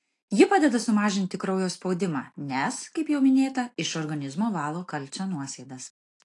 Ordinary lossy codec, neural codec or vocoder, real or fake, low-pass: AAC, 48 kbps; none; real; 10.8 kHz